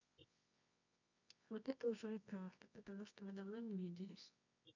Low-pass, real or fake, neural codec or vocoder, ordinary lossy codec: 7.2 kHz; fake; codec, 24 kHz, 0.9 kbps, WavTokenizer, medium music audio release; none